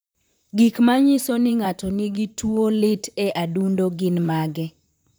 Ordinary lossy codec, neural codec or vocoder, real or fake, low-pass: none; vocoder, 44.1 kHz, 128 mel bands, Pupu-Vocoder; fake; none